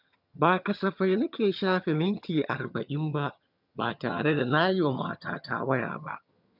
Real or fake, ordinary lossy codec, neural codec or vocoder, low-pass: fake; none; vocoder, 22.05 kHz, 80 mel bands, HiFi-GAN; 5.4 kHz